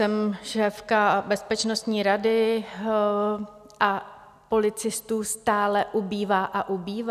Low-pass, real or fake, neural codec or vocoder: 14.4 kHz; real; none